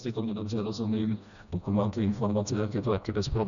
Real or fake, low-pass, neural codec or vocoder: fake; 7.2 kHz; codec, 16 kHz, 1 kbps, FreqCodec, smaller model